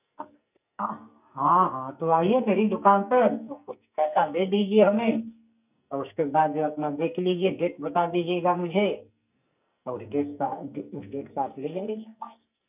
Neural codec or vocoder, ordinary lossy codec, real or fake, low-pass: codec, 44.1 kHz, 2.6 kbps, SNAC; none; fake; 3.6 kHz